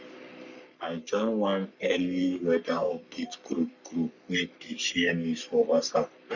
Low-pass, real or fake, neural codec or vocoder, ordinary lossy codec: 7.2 kHz; fake; codec, 44.1 kHz, 3.4 kbps, Pupu-Codec; none